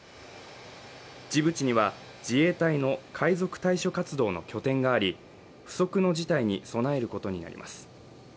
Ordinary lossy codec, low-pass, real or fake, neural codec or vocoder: none; none; real; none